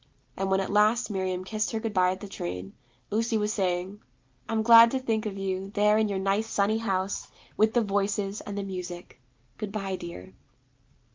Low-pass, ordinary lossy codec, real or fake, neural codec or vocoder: 7.2 kHz; Opus, 32 kbps; real; none